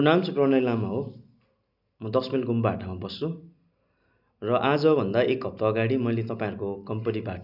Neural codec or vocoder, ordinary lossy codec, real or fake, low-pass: none; none; real; 5.4 kHz